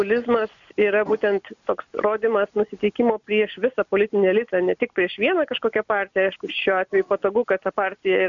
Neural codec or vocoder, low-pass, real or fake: none; 7.2 kHz; real